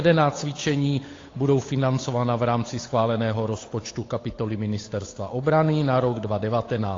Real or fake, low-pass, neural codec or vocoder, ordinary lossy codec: fake; 7.2 kHz; codec, 16 kHz, 8 kbps, FunCodec, trained on Chinese and English, 25 frames a second; AAC, 32 kbps